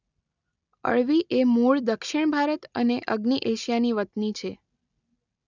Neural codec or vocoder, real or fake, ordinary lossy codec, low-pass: none; real; none; 7.2 kHz